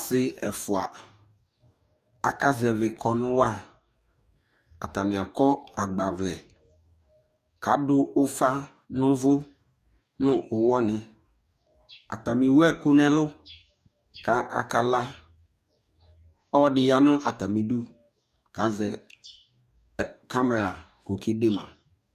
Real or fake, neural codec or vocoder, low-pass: fake; codec, 44.1 kHz, 2.6 kbps, DAC; 14.4 kHz